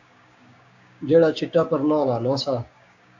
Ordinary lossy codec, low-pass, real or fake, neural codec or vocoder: AAC, 48 kbps; 7.2 kHz; fake; codec, 16 kHz, 6 kbps, DAC